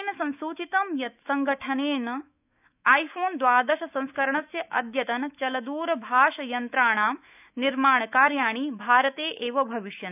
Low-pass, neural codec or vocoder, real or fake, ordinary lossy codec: 3.6 kHz; autoencoder, 48 kHz, 128 numbers a frame, DAC-VAE, trained on Japanese speech; fake; none